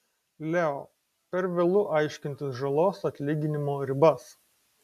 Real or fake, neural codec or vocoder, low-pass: real; none; 14.4 kHz